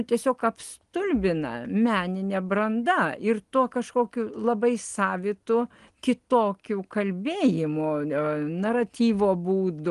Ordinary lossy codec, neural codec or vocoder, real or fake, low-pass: Opus, 24 kbps; none; real; 10.8 kHz